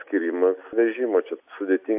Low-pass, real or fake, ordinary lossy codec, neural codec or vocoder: 3.6 kHz; real; AAC, 32 kbps; none